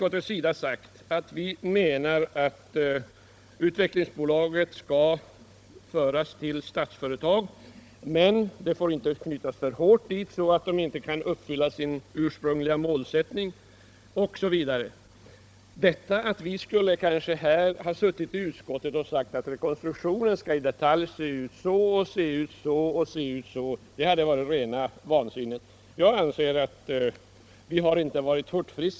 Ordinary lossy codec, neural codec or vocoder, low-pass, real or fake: none; codec, 16 kHz, 16 kbps, FunCodec, trained on Chinese and English, 50 frames a second; none; fake